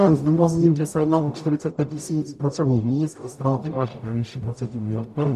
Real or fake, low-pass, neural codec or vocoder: fake; 14.4 kHz; codec, 44.1 kHz, 0.9 kbps, DAC